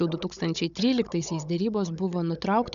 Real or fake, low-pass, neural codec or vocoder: fake; 7.2 kHz; codec, 16 kHz, 16 kbps, FunCodec, trained on Chinese and English, 50 frames a second